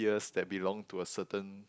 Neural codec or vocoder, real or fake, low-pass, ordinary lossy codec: none; real; none; none